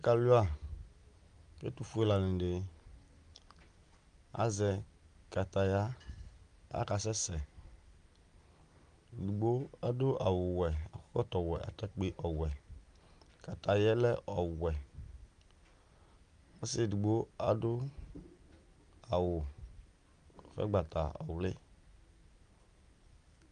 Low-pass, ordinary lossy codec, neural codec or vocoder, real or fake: 9.9 kHz; Opus, 32 kbps; none; real